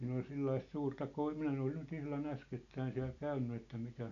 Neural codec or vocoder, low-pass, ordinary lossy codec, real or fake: none; 7.2 kHz; none; real